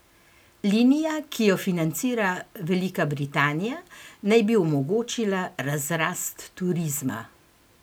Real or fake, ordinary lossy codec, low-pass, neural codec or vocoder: real; none; none; none